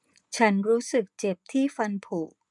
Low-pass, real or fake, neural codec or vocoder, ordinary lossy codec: 10.8 kHz; fake; vocoder, 24 kHz, 100 mel bands, Vocos; none